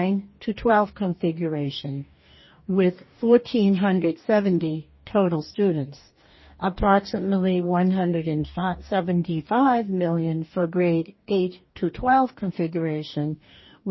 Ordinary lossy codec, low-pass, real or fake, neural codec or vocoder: MP3, 24 kbps; 7.2 kHz; fake; codec, 44.1 kHz, 2.6 kbps, DAC